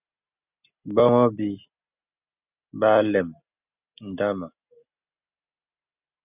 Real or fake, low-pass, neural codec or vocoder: real; 3.6 kHz; none